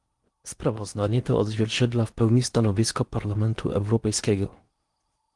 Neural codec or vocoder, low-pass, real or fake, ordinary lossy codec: codec, 16 kHz in and 24 kHz out, 0.8 kbps, FocalCodec, streaming, 65536 codes; 10.8 kHz; fake; Opus, 24 kbps